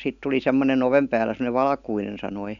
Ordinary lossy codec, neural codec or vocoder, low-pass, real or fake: none; none; 7.2 kHz; real